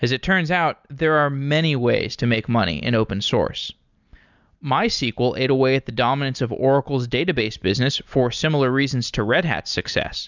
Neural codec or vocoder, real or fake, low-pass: none; real; 7.2 kHz